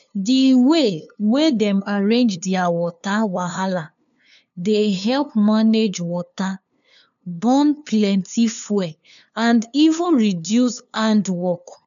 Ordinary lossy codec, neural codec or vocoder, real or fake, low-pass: none; codec, 16 kHz, 2 kbps, FunCodec, trained on LibriTTS, 25 frames a second; fake; 7.2 kHz